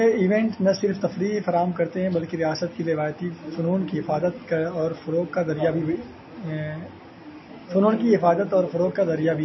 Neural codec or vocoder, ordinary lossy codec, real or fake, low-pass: none; MP3, 24 kbps; real; 7.2 kHz